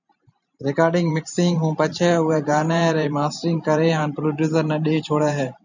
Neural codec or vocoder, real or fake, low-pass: vocoder, 44.1 kHz, 128 mel bands every 512 samples, BigVGAN v2; fake; 7.2 kHz